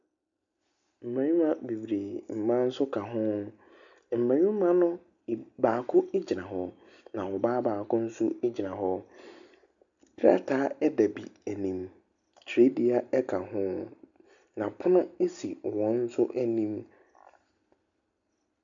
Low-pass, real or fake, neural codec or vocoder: 7.2 kHz; real; none